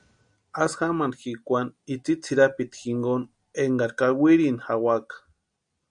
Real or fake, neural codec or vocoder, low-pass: real; none; 9.9 kHz